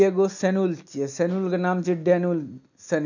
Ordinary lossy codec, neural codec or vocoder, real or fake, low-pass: none; none; real; 7.2 kHz